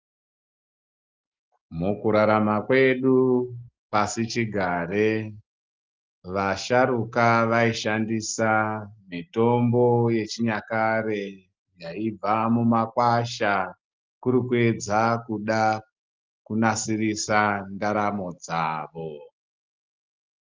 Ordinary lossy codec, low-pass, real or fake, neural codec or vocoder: Opus, 32 kbps; 7.2 kHz; real; none